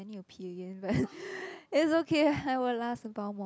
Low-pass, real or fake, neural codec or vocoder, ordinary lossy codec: none; real; none; none